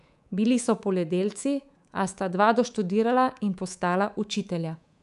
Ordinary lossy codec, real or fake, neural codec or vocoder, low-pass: none; fake; codec, 24 kHz, 3.1 kbps, DualCodec; 10.8 kHz